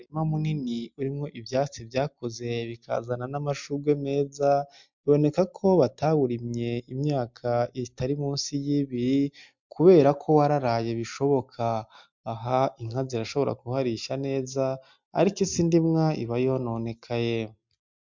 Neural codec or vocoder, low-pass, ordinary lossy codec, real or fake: none; 7.2 kHz; MP3, 64 kbps; real